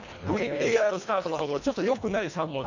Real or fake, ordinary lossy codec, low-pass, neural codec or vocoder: fake; none; 7.2 kHz; codec, 24 kHz, 1.5 kbps, HILCodec